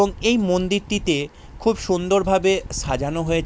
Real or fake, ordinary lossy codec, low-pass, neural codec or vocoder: real; none; none; none